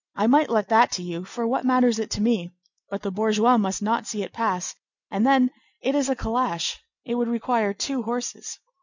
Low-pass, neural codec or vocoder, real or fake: 7.2 kHz; none; real